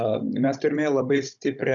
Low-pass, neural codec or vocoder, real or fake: 7.2 kHz; codec, 16 kHz, 16 kbps, FunCodec, trained on Chinese and English, 50 frames a second; fake